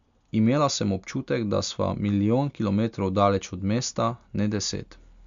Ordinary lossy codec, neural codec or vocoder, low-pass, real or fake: MP3, 64 kbps; none; 7.2 kHz; real